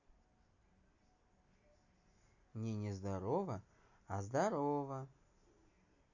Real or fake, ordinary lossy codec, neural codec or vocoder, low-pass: real; none; none; 7.2 kHz